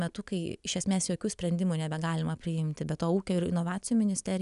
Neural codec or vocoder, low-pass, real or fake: none; 10.8 kHz; real